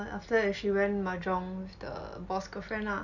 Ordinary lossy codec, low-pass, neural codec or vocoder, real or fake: none; 7.2 kHz; none; real